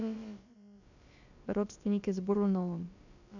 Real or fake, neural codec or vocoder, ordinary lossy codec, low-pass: fake; codec, 16 kHz, about 1 kbps, DyCAST, with the encoder's durations; none; 7.2 kHz